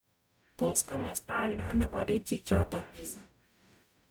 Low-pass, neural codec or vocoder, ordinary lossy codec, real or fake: none; codec, 44.1 kHz, 0.9 kbps, DAC; none; fake